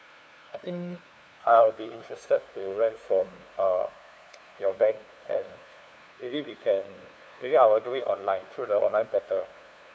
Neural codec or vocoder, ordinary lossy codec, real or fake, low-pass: codec, 16 kHz, 2 kbps, FunCodec, trained on LibriTTS, 25 frames a second; none; fake; none